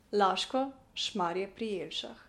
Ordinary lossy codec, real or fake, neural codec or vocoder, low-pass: MP3, 64 kbps; real; none; 19.8 kHz